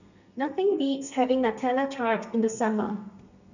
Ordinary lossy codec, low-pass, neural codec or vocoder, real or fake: none; 7.2 kHz; codec, 32 kHz, 1.9 kbps, SNAC; fake